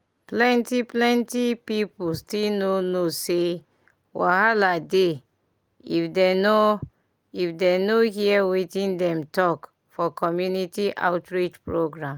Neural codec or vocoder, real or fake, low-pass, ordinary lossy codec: none; real; 19.8 kHz; Opus, 32 kbps